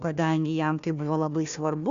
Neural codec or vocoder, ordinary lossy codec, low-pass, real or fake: codec, 16 kHz, 1 kbps, FunCodec, trained on Chinese and English, 50 frames a second; Opus, 64 kbps; 7.2 kHz; fake